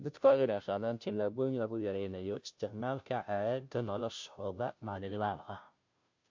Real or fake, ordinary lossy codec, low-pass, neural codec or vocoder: fake; MP3, 48 kbps; 7.2 kHz; codec, 16 kHz, 0.5 kbps, FunCodec, trained on Chinese and English, 25 frames a second